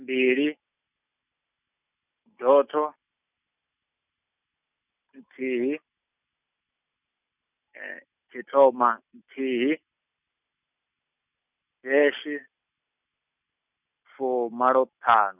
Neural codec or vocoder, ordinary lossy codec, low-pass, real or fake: none; none; 3.6 kHz; real